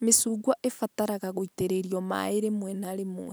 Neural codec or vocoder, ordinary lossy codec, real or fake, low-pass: none; none; real; none